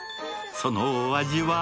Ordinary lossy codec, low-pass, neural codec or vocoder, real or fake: none; none; none; real